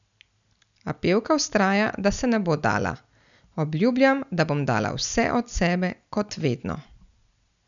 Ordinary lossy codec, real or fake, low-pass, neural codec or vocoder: none; real; 7.2 kHz; none